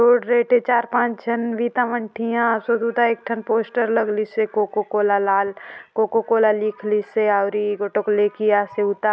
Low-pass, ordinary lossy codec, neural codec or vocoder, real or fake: none; none; none; real